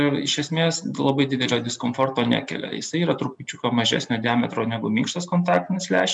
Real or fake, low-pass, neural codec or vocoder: real; 10.8 kHz; none